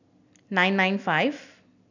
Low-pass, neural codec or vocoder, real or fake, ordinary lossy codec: 7.2 kHz; none; real; none